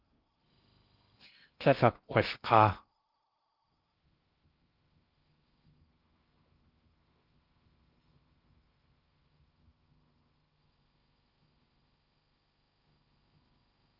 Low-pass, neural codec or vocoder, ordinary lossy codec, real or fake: 5.4 kHz; codec, 16 kHz in and 24 kHz out, 0.6 kbps, FocalCodec, streaming, 2048 codes; Opus, 32 kbps; fake